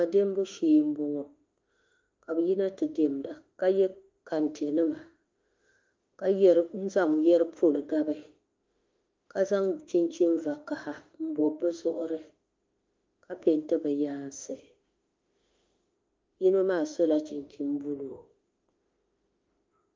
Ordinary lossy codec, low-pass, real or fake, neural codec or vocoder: Opus, 32 kbps; 7.2 kHz; fake; autoencoder, 48 kHz, 32 numbers a frame, DAC-VAE, trained on Japanese speech